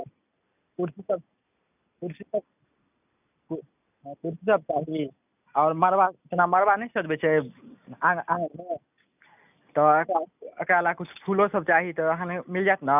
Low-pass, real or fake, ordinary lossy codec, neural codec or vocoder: 3.6 kHz; real; none; none